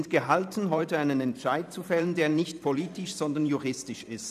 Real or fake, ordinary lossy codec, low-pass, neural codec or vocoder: fake; none; 14.4 kHz; vocoder, 44.1 kHz, 128 mel bands every 512 samples, BigVGAN v2